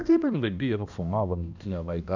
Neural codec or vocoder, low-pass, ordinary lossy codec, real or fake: codec, 16 kHz, 1 kbps, X-Codec, HuBERT features, trained on balanced general audio; 7.2 kHz; none; fake